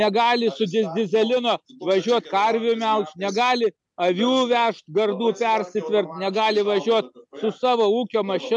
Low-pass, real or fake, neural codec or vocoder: 10.8 kHz; real; none